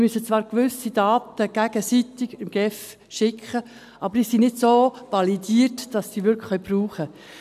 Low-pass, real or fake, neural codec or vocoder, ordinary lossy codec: 14.4 kHz; real; none; none